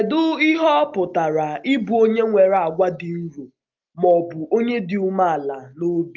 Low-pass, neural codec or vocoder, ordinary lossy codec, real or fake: 7.2 kHz; none; Opus, 24 kbps; real